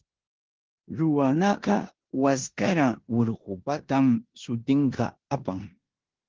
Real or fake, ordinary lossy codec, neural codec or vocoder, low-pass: fake; Opus, 16 kbps; codec, 16 kHz in and 24 kHz out, 0.9 kbps, LongCat-Audio-Codec, four codebook decoder; 7.2 kHz